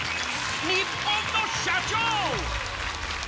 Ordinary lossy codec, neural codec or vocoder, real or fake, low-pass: none; none; real; none